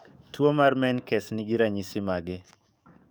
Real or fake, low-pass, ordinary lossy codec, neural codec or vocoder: fake; none; none; codec, 44.1 kHz, 7.8 kbps, DAC